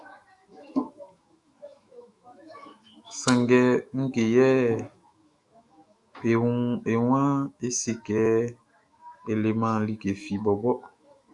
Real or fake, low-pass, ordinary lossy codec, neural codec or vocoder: fake; 10.8 kHz; Opus, 64 kbps; autoencoder, 48 kHz, 128 numbers a frame, DAC-VAE, trained on Japanese speech